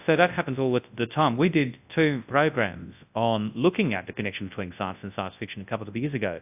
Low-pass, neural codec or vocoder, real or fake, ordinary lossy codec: 3.6 kHz; codec, 24 kHz, 0.9 kbps, WavTokenizer, large speech release; fake; AAC, 32 kbps